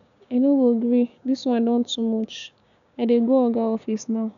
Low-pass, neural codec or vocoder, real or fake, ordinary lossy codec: 7.2 kHz; codec, 16 kHz, 6 kbps, DAC; fake; none